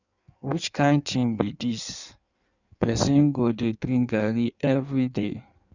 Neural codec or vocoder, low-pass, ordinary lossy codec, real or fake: codec, 16 kHz in and 24 kHz out, 1.1 kbps, FireRedTTS-2 codec; 7.2 kHz; none; fake